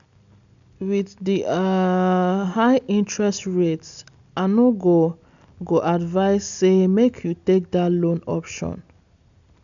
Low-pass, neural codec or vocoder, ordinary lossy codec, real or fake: 7.2 kHz; none; none; real